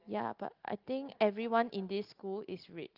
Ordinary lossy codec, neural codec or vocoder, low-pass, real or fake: Opus, 32 kbps; none; 5.4 kHz; real